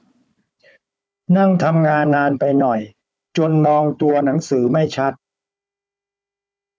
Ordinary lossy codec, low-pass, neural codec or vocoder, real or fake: none; none; codec, 16 kHz, 4 kbps, FunCodec, trained on Chinese and English, 50 frames a second; fake